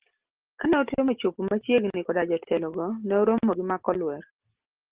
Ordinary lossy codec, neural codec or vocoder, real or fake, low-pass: Opus, 16 kbps; none; real; 3.6 kHz